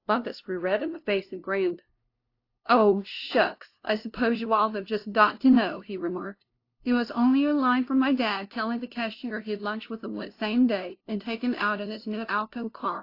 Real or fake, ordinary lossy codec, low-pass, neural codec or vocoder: fake; AAC, 32 kbps; 5.4 kHz; codec, 16 kHz, 0.5 kbps, FunCodec, trained on LibriTTS, 25 frames a second